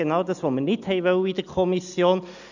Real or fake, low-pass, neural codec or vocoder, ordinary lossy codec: real; 7.2 kHz; none; none